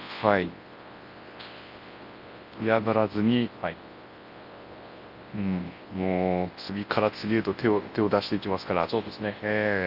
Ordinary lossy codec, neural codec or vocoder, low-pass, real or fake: Opus, 24 kbps; codec, 24 kHz, 0.9 kbps, WavTokenizer, large speech release; 5.4 kHz; fake